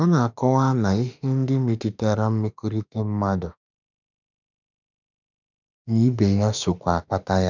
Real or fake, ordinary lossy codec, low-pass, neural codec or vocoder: fake; none; 7.2 kHz; autoencoder, 48 kHz, 32 numbers a frame, DAC-VAE, trained on Japanese speech